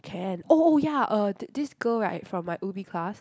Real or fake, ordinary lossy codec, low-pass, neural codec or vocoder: real; none; none; none